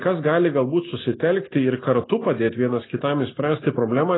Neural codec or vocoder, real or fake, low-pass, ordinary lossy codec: none; real; 7.2 kHz; AAC, 16 kbps